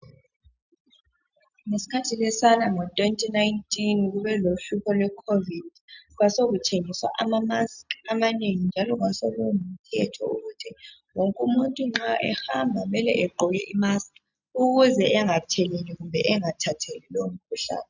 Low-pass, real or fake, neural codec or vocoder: 7.2 kHz; real; none